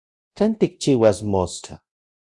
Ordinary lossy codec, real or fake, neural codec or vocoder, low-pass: Opus, 64 kbps; fake; codec, 24 kHz, 0.9 kbps, DualCodec; 10.8 kHz